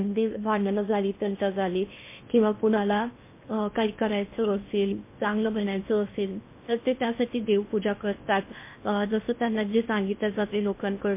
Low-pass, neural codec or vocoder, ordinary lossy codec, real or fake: 3.6 kHz; codec, 16 kHz in and 24 kHz out, 0.6 kbps, FocalCodec, streaming, 2048 codes; MP3, 24 kbps; fake